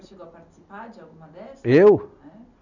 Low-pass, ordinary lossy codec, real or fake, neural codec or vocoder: 7.2 kHz; none; real; none